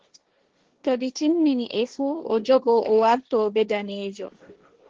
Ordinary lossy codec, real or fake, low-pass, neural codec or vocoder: Opus, 16 kbps; fake; 7.2 kHz; codec, 16 kHz, 1.1 kbps, Voila-Tokenizer